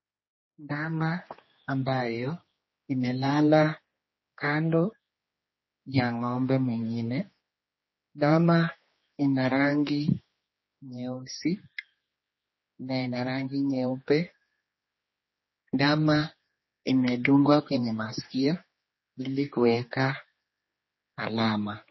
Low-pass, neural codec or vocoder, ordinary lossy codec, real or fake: 7.2 kHz; codec, 16 kHz, 2 kbps, X-Codec, HuBERT features, trained on general audio; MP3, 24 kbps; fake